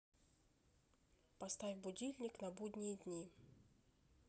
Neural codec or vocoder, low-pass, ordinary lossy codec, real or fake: none; none; none; real